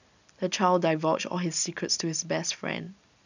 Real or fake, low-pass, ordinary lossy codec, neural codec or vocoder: real; 7.2 kHz; none; none